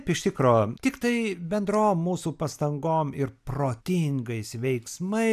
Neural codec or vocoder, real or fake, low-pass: none; real; 14.4 kHz